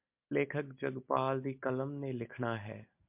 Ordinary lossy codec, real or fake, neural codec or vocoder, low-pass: MP3, 32 kbps; real; none; 3.6 kHz